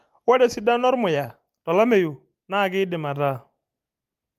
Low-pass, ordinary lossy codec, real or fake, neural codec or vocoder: 14.4 kHz; Opus, 32 kbps; real; none